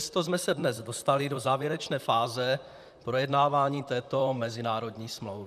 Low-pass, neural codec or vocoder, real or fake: 14.4 kHz; vocoder, 44.1 kHz, 128 mel bands, Pupu-Vocoder; fake